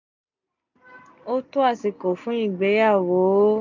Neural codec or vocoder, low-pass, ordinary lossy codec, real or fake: none; 7.2 kHz; none; real